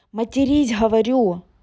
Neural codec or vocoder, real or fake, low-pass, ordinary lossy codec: none; real; none; none